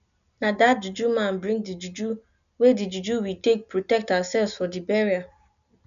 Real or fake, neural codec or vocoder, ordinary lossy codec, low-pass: real; none; none; 7.2 kHz